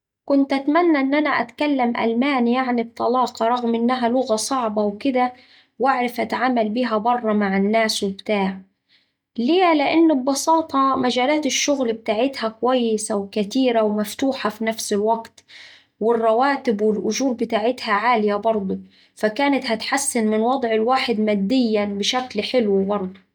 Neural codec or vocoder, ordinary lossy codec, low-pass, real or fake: none; none; 19.8 kHz; real